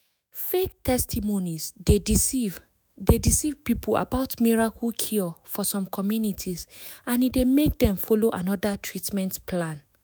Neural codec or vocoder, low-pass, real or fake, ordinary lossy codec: autoencoder, 48 kHz, 128 numbers a frame, DAC-VAE, trained on Japanese speech; none; fake; none